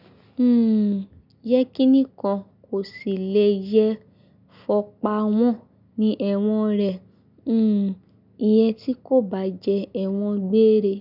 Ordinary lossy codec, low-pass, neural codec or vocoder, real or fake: none; 5.4 kHz; none; real